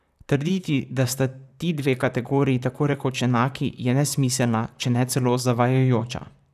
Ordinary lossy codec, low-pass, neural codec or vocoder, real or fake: none; 14.4 kHz; vocoder, 44.1 kHz, 128 mel bands, Pupu-Vocoder; fake